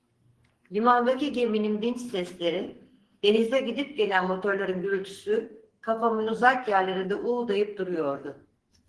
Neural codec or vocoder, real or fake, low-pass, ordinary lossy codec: codec, 44.1 kHz, 2.6 kbps, SNAC; fake; 10.8 kHz; Opus, 16 kbps